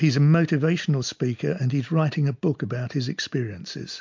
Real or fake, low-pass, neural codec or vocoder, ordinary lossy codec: real; 7.2 kHz; none; MP3, 64 kbps